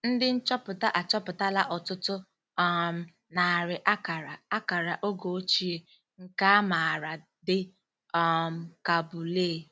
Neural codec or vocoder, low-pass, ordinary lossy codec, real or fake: none; none; none; real